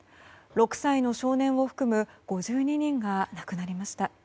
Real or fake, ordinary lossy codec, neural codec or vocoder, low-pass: real; none; none; none